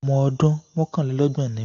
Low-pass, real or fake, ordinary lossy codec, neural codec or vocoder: 7.2 kHz; real; AAC, 48 kbps; none